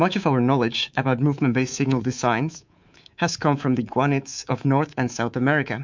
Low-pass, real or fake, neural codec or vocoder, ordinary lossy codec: 7.2 kHz; fake; codec, 24 kHz, 3.1 kbps, DualCodec; MP3, 64 kbps